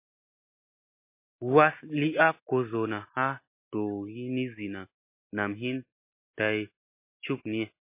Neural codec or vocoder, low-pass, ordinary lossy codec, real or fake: none; 3.6 kHz; MP3, 24 kbps; real